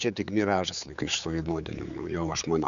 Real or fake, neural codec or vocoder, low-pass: fake; codec, 16 kHz, 4 kbps, X-Codec, HuBERT features, trained on general audio; 7.2 kHz